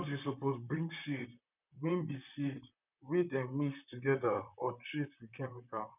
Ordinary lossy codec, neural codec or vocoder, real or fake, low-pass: none; vocoder, 44.1 kHz, 128 mel bands, Pupu-Vocoder; fake; 3.6 kHz